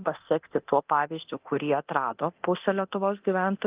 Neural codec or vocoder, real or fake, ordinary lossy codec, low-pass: codec, 24 kHz, 0.9 kbps, DualCodec; fake; Opus, 24 kbps; 3.6 kHz